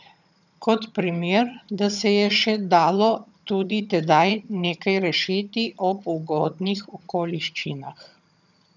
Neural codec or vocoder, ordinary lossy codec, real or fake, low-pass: vocoder, 22.05 kHz, 80 mel bands, HiFi-GAN; none; fake; 7.2 kHz